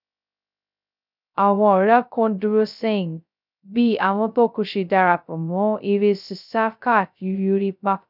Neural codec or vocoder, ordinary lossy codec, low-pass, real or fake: codec, 16 kHz, 0.2 kbps, FocalCodec; none; 5.4 kHz; fake